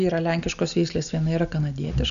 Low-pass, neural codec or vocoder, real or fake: 7.2 kHz; none; real